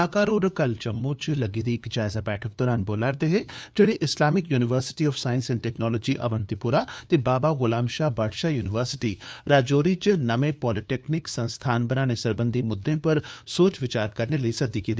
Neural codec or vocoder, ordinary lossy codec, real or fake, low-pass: codec, 16 kHz, 2 kbps, FunCodec, trained on LibriTTS, 25 frames a second; none; fake; none